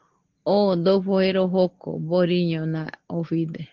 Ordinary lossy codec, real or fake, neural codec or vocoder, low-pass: Opus, 16 kbps; real; none; 7.2 kHz